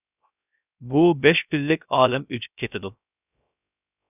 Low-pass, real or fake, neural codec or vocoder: 3.6 kHz; fake; codec, 16 kHz, 0.3 kbps, FocalCodec